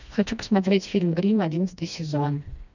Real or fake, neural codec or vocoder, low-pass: fake; codec, 16 kHz, 1 kbps, FreqCodec, smaller model; 7.2 kHz